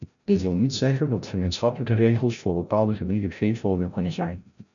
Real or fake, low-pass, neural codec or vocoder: fake; 7.2 kHz; codec, 16 kHz, 0.5 kbps, FreqCodec, larger model